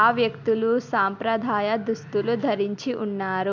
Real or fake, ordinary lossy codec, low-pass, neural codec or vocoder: real; MP3, 64 kbps; 7.2 kHz; none